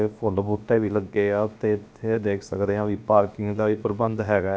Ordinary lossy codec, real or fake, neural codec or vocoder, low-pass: none; fake; codec, 16 kHz, about 1 kbps, DyCAST, with the encoder's durations; none